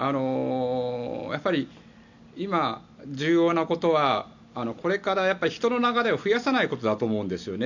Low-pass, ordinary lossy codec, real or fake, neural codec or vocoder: 7.2 kHz; none; real; none